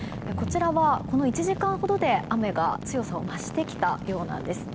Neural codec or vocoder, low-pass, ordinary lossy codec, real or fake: none; none; none; real